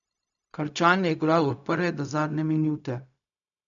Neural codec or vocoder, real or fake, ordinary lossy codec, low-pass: codec, 16 kHz, 0.4 kbps, LongCat-Audio-Codec; fake; none; 7.2 kHz